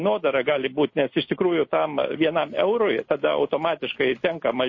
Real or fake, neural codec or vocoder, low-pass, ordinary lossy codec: real; none; 7.2 kHz; MP3, 32 kbps